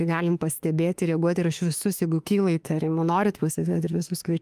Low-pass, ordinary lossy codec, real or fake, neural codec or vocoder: 14.4 kHz; Opus, 32 kbps; fake; autoencoder, 48 kHz, 32 numbers a frame, DAC-VAE, trained on Japanese speech